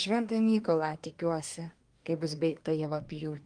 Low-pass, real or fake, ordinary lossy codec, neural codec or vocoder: 9.9 kHz; fake; Opus, 32 kbps; codec, 24 kHz, 1 kbps, SNAC